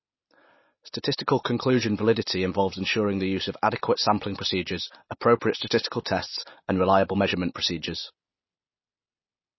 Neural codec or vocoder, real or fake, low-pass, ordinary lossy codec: none; real; 7.2 kHz; MP3, 24 kbps